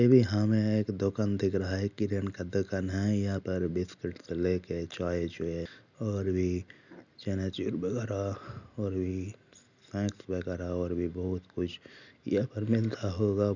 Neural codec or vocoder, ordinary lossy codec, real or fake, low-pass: none; none; real; 7.2 kHz